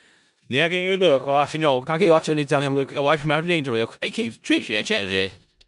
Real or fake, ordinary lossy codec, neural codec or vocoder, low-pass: fake; none; codec, 16 kHz in and 24 kHz out, 0.4 kbps, LongCat-Audio-Codec, four codebook decoder; 10.8 kHz